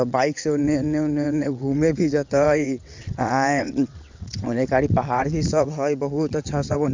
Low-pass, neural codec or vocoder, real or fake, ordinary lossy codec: 7.2 kHz; vocoder, 22.05 kHz, 80 mel bands, WaveNeXt; fake; MP3, 64 kbps